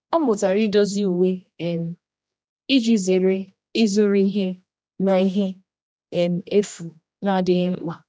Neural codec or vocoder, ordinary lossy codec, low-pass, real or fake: codec, 16 kHz, 1 kbps, X-Codec, HuBERT features, trained on general audio; none; none; fake